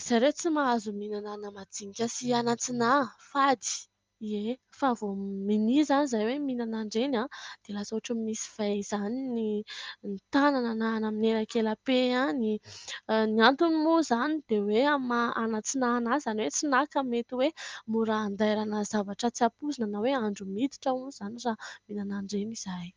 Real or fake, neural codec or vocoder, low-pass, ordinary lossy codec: real; none; 7.2 kHz; Opus, 32 kbps